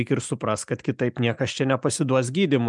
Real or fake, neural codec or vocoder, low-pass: real; none; 10.8 kHz